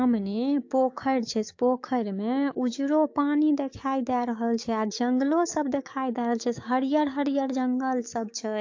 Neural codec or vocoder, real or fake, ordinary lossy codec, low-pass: codec, 16 kHz, 6 kbps, DAC; fake; AAC, 48 kbps; 7.2 kHz